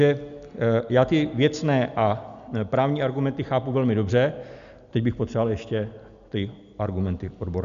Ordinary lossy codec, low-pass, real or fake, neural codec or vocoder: MP3, 96 kbps; 7.2 kHz; real; none